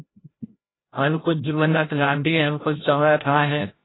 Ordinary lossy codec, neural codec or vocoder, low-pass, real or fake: AAC, 16 kbps; codec, 16 kHz, 0.5 kbps, FreqCodec, larger model; 7.2 kHz; fake